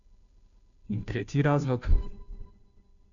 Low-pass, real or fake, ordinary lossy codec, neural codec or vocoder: 7.2 kHz; fake; none; codec, 16 kHz, 0.5 kbps, FunCodec, trained on Chinese and English, 25 frames a second